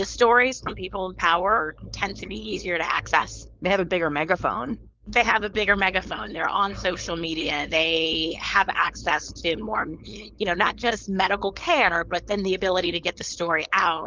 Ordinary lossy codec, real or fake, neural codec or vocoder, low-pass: Opus, 32 kbps; fake; codec, 16 kHz, 4.8 kbps, FACodec; 7.2 kHz